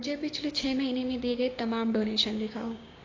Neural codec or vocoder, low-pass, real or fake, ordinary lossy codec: codec, 16 kHz, 6 kbps, DAC; 7.2 kHz; fake; AAC, 32 kbps